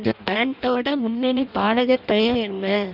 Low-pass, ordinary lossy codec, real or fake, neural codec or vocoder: 5.4 kHz; none; fake; codec, 16 kHz in and 24 kHz out, 0.6 kbps, FireRedTTS-2 codec